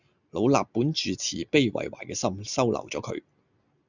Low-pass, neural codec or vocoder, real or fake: 7.2 kHz; none; real